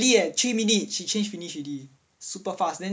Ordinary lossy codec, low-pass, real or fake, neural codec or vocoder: none; none; real; none